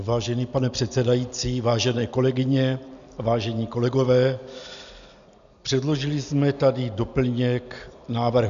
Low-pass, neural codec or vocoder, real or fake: 7.2 kHz; none; real